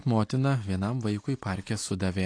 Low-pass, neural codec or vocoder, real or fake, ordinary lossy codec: 9.9 kHz; none; real; AAC, 48 kbps